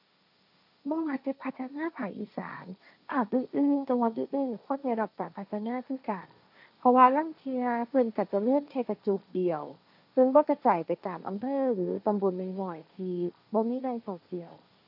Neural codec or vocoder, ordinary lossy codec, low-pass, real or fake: codec, 16 kHz, 1.1 kbps, Voila-Tokenizer; none; 5.4 kHz; fake